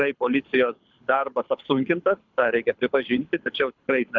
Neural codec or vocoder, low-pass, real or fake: codec, 24 kHz, 6 kbps, HILCodec; 7.2 kHz; fake